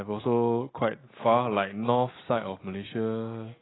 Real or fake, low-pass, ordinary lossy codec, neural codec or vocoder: real; 7.2 kHz; AAC, 16 kbps; none